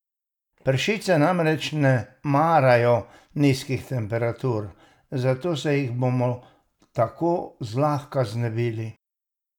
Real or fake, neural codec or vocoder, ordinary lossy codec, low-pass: real; none; none; 19.8 kHz